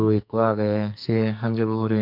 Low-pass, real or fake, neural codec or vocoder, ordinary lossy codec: 5.4 kHz; fake; codec, 44.1 kHz, 2.6 kbps, SNAC; none